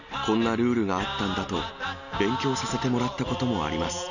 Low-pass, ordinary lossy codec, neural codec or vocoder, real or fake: 7.2 kHz; none; none; real